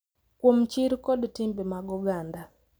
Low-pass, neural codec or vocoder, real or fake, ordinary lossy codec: none; none; real; none